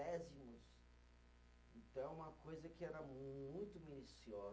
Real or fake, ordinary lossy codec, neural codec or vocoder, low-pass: real; none; none; none